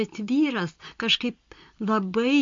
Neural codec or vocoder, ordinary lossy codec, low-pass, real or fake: none; MP3, 48 kbps; 7.2 kHz; real